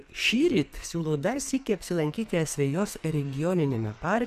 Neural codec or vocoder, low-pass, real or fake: codec, 44.1 kHz, 2.6 kbps, SNAC; 14.4 kHz; fake